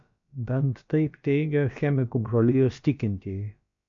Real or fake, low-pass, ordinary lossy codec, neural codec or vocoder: fake; 7.2 kHz; MP3, 64 kbps; codec, 16 kHz, about 1 kbps, DyCAST, with the encoder's durations